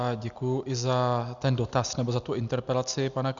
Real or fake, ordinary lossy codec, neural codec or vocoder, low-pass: real; Opus, 64 kbps; none; 7.2 kHz